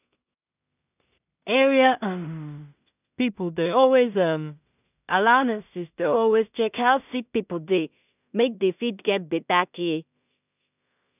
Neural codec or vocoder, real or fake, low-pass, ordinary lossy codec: codec, 16 kHz in and 24 kHz out, 0.4 kbps, LongCat-Audio-Codec, two codebook decoder; fake; 3.6 kHz; none